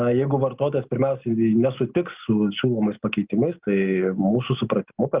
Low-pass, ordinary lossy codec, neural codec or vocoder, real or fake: 3.6 kHz; Opus, 32 kbps; none; real